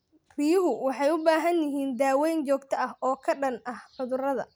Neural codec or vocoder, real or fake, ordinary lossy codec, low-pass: none; real; none; none